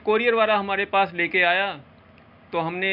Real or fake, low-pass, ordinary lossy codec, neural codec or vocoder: real; 5.4 kHz; none; none